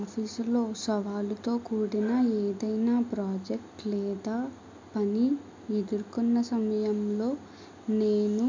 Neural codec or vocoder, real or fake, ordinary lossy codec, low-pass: none; real; MP3, 64 kbps; 7.2 kHz